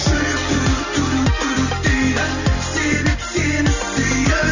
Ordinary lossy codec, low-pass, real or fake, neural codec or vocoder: none; 7.2 kHz; real; none